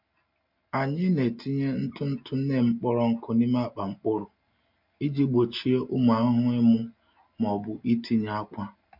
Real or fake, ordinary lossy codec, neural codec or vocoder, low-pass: real; MP3, 48 kbps; none; 5.4 kHz